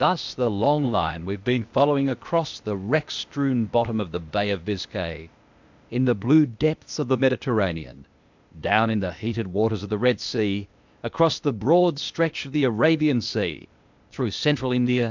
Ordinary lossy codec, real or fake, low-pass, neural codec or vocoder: MP3, 64 kbps; fake; 7.2 kHz; codec, 16 kHz, 0.8 kbps, ZipCodec